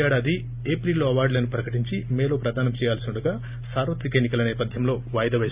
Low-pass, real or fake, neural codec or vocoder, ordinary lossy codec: 3.6 kHz; real; none; Opus, 64 kbps